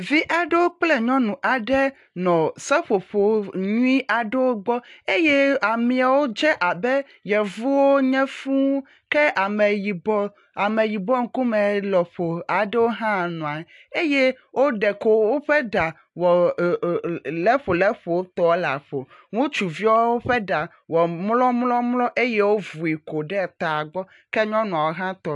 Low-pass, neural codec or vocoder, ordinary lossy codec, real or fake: 10.8 kHz; none; AAC, 64 kbps; real